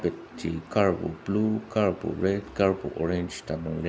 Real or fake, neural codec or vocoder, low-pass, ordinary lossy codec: real; none; none; none